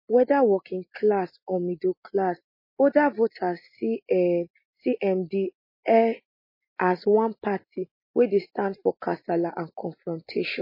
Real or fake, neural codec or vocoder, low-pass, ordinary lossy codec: real; none; 5.4 kHz; MP3, 24 kbps